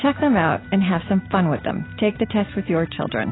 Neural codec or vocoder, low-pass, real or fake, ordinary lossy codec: none; 7.2 kHz; real; AAC, 16 kbps